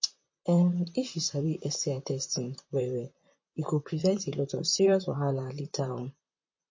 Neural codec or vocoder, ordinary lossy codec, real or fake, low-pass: vocoder, 44.1 kHz, 128 mel bands, Pupu-Vocoder; MP3, 32 kbps; fake; 7.2 kHz